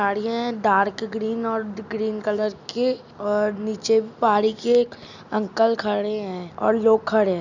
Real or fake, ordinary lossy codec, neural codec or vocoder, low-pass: real; none; none; 7.2 kHz